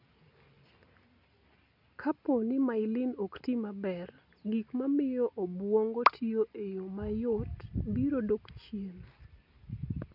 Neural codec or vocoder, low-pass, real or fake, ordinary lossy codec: none; 5.4 kHz; real; none